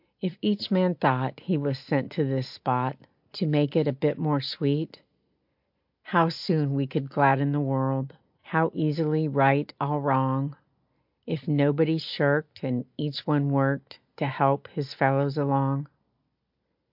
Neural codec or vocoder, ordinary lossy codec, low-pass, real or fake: none; MP3, 48 kbps; 5.4 kHz; real